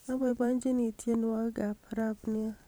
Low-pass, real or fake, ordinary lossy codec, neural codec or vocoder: none; fake; none; vocoder, 44.1 kHz, 128 mel bands every 512 samples, BigVGAN v2